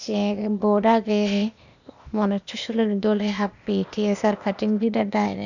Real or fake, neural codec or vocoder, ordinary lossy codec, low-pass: fake; codec, 16 kHz, 0.8 kbps, ZipCodec; none; 7.2 kHz